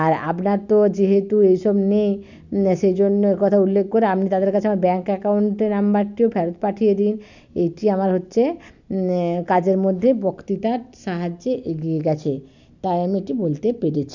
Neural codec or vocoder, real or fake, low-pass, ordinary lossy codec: none; real; 7.2 kHz; none